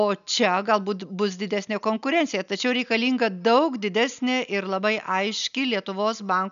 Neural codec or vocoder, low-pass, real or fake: none; 7.2 kHz; real